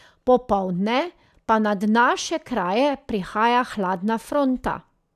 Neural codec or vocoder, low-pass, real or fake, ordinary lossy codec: none; 14.4 kHz; real; none